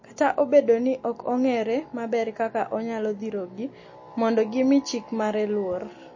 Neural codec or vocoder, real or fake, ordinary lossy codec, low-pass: none; real; MP3, 32 kbps; 7.2 kHz